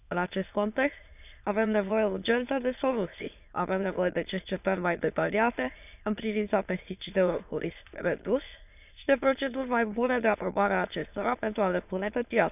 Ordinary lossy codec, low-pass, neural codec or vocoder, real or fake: none; 3.6 kHz; autoencoder, 22.05 kHz, a latent of 192 numbers a frame, VITS, trained on many speakers; fake